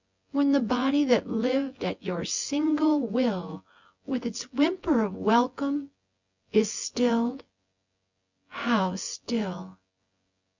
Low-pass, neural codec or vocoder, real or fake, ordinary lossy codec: 7.2 kHz; vocoder, 24 kHz, 100 mel bands, Vocos; fake; Opus, 64 kbps